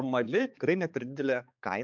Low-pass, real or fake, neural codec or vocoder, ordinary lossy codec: 7.2 kHz; fake; codec, 16 kHz, 4 kbps, X-Codec, HuBERT features, trained on balanced general audio; MP3, 64 kbps